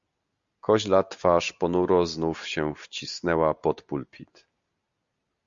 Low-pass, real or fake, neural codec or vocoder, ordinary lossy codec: 7.2 kHz; real; none; Opus, 64 kbps